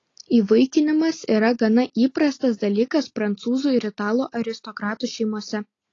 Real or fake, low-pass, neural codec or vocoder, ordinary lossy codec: real; 7.2 kHz; none; AAC, 32 kbps